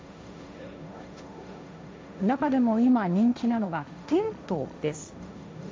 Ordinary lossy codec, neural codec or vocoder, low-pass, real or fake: none; codec, 16 kHz, 1.1 kbps, Voila-Tokenizer; none; fake